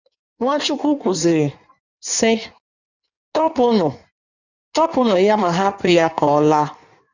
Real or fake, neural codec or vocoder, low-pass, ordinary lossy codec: fake; codec, 16 kHz in and 24 kHz out, 1.1 kbps, FireRedTTS-2 codec; 7.2 kHz; none